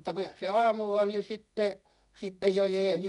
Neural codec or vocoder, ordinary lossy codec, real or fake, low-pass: codec, 24 kHz, 0.9 kbps, WavTokenizer, medium music audio release; none; fake; 10.8 kHz